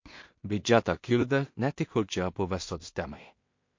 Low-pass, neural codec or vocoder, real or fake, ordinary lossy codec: 7.2 kHz; codec, 16 kHz in and 24 kHz out, 0.4 kbps, LongCat-Audio-Codec, two codebook decoder; fake; MP3, 48 kbps